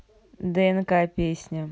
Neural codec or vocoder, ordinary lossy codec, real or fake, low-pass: none; none; real; none